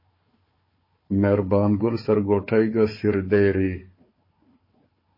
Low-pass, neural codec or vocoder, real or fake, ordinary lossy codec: 5.4 kHz; codec, 16 kHz, 4 kbps, FunCodec, trained on Chinese and English, 50 frames a second; fake; MP3, 24 kbps